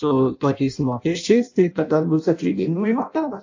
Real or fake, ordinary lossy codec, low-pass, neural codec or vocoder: fake; AAC, 48 kbps; 7.2 kHz; codec, 16 kHz in and 24 kHz out, 0.6 kbps, FireRedTTS-2 codec